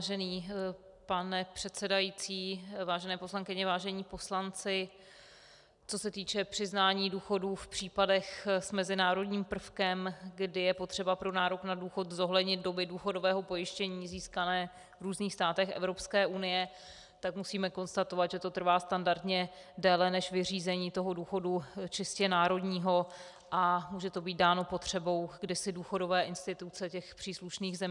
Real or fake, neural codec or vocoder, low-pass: real; none; 10.8 kHz